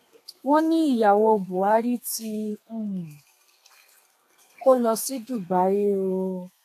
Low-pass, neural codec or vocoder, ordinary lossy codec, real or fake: 14.4 kHz; codec, 32 kHz, 1.9 kbps, SNAC; AAC, 64 kbps; fake